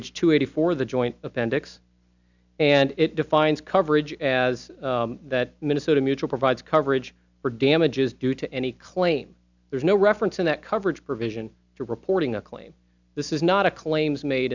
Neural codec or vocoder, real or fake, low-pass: none; real; 7.2 kHz